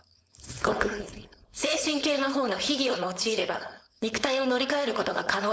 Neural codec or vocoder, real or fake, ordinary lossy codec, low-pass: codec, 16 kHz, 4.8 kbps, FACodec; fake; none; none